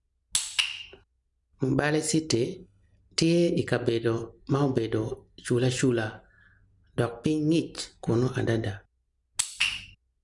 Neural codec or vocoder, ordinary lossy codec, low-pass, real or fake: none; none; 10.8 kHz; real